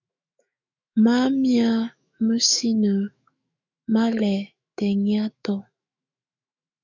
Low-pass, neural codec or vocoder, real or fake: 7.2 kHz; autoencoder, 48 kHz, 128 numbers a frame, DAC-VAE, trained on Japanese speech; fake